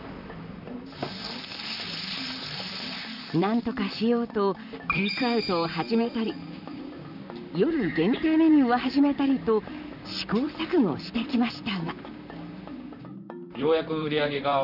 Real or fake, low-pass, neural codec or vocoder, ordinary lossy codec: fake; 5.4 kHz; vocoder, 44.1 kHz, 128 mel bands, Pupu-Vocoder; none